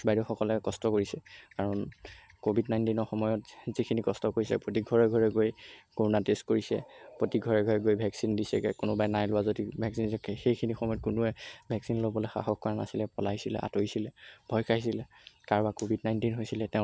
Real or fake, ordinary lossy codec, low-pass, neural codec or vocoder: real; none; none; none